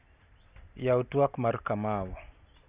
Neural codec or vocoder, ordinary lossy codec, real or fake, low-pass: none; Opus, 64 kbps; real; 3.6 kHz